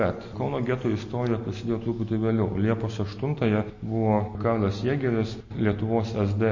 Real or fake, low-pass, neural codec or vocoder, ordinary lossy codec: real; 7.2 kHz; none; MP3, 32 kbps